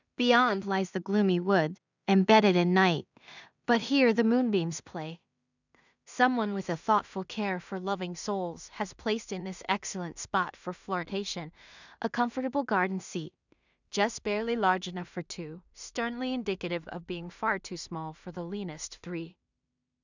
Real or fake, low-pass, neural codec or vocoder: fake; 7.2 kHz; codec, 16 kHz in and 24 kHz out, 0.4 kbps, LongCat-Audio-Codec, two codebook decoder